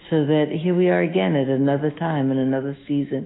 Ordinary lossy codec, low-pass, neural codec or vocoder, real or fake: AAC, 16 kbps; 7.2 kHz; autoencoder, 48 kHz, 128 numbers a frame, DAC-VAE, trained on Japanese speech; fake